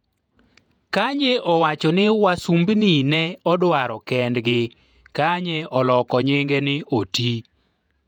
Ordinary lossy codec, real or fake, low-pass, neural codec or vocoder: none; fake; 19.8 kHz; vocoder, 48 kHz, 128 mel bands, Vocos